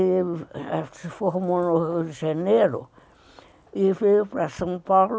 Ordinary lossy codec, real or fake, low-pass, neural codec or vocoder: none; real; none; none